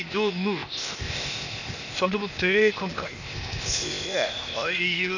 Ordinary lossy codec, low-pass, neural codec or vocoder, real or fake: none; 7.2 kHz; codec, 16 kHz, 0.8 kbps, ZipCodec; fake